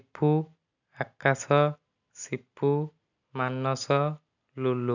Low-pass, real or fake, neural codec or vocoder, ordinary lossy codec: 7.2 kHz; real; none; none